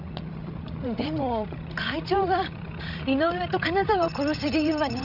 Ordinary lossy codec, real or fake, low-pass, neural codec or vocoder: none; fake; 5.4 kHz; codec, 16 kHz, 16 kbps, FreqCodec, larger model